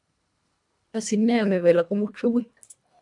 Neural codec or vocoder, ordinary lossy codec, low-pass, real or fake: codec, 24 kHz, 1.5 kbps, HILCodec; MP3, 96 kbps; 10.8 kHz; fake